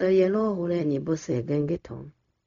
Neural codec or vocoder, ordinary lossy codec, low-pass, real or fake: codec, 16 kHz, 0.4 kbps, LongCat-Audio-Codec; none; 7.2 kHz; fake